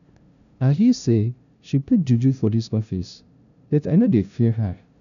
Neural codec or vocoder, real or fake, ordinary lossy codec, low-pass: codec, 16 kHz, 0.5 kbps, FunCodec, trained on LibriTTS, 25 frames a second; fake; none; 7.2 kHz